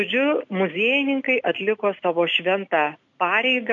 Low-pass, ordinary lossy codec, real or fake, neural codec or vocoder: 7.2 kHz; MP3, 64 kbps; real; none